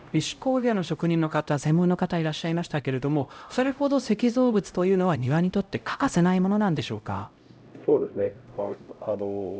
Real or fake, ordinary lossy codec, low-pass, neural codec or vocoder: fake; none; none; codec, 16 kHz, 0.5 kbps, X-Codec, HuBERT features, trained on LibriSpeech